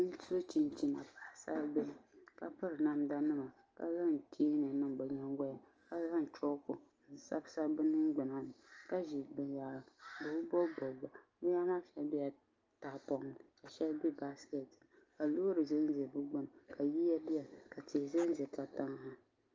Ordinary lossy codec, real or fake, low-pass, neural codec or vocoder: Opus, 24 kbps; real; 7.2 kHz; none